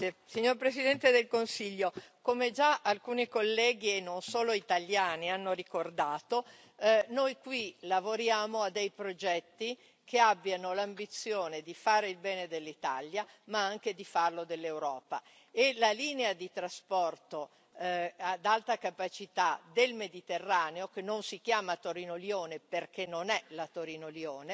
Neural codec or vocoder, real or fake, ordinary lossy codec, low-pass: none; real; none; none